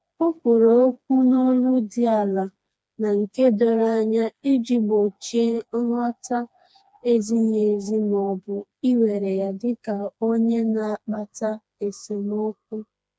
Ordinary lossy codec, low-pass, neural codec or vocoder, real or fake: none; none; codec, 16 kHz, 2 kbps, FreqCodec, smaller model; fake